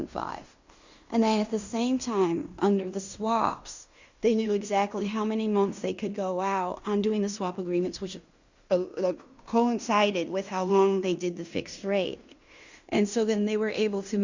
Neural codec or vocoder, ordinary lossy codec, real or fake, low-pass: codec, 16 kHz in and 24 kHz out, 0.9 kbps, LongCat-Audio-Codec, fine tuned four codebook decoder; Opus, 64 kbps; fake; 7.2 kHz